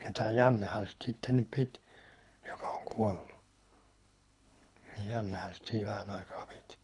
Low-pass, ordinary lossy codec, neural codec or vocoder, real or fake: 10.8 kHz; none; codec, 24 kHz, 3 kbps, HILCodec; fake